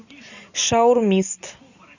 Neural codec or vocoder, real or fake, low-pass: none; real; 7.2 kHz